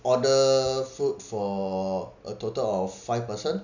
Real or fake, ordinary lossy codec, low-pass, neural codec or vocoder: real; none; 7.2 kHz; none